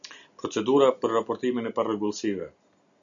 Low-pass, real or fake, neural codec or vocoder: 7.2 kHz; real; none